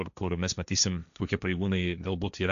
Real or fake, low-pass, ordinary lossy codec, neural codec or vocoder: fake; 7.2 kHz; MP3, 96 kbps; codec, 16 kHz, 1.1 kbps, Voila-Tokenizer